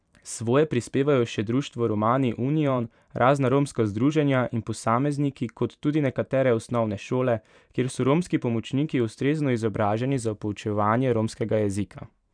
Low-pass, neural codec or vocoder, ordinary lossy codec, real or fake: 9.9 kHz; vocoder, 44.1 kHz, 128 mel bands every 512 samples, BigVGAN v2; none; fake